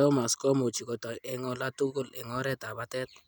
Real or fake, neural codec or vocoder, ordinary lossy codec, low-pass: real; none; none; none